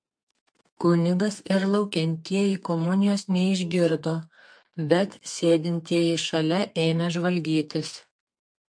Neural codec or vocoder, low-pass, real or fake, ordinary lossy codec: codec, 32 kHz, 1.9 kbps, SNAC; 9.9 kHz; fake; MP3, 48 kbps